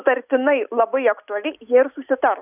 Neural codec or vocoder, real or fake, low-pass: none; real; 3.6 kHz